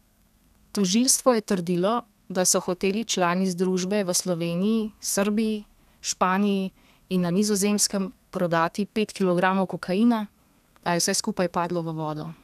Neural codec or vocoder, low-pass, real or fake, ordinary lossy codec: codec, 32 kHz, 1.9 kbps, SNAC; 14.4 kHz; fake; none